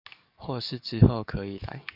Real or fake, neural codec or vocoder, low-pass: fake; codec, 44.1 kHz, 7.8 kbps, DAC; 5.4 kHz